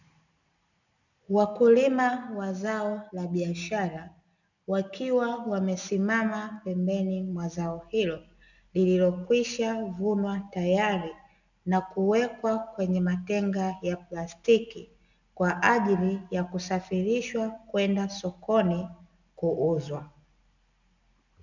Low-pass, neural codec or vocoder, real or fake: 7.2 kHz; none; real